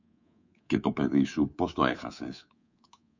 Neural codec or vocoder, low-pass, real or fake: codec, 24 kHz, 3.1 kbps, DualCodec; 7.2 kHz; fake